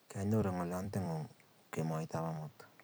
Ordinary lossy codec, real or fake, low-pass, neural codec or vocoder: none; fake; none; vocoder, 44.1 kHz, 128 mel bands every 256 samples, BigVGAN v2